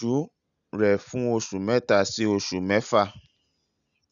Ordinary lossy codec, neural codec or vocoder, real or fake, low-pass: none; none; real; 7.2 kHz